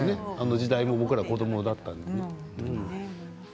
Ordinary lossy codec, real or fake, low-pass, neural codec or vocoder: none; real; none; none